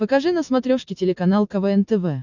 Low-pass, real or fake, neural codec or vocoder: 7.2 kHz; real; none